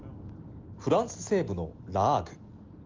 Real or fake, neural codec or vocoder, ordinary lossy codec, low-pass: real; none; Opus, 32 kbps; 7.2 kHz